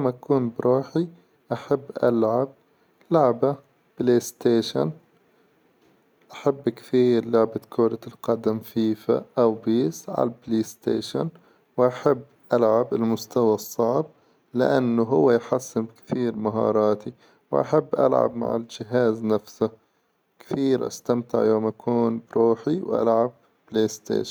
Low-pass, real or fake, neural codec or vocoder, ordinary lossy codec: none; real; none; none